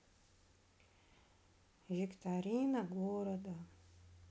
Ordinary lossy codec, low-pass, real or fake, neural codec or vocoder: none; none; real; none